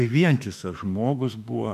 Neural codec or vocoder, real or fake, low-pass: autoencoder, 48 kHz, 32 numbers a frame, DAC-VAE, trained on Japanese speech; fake; 14.4 kHz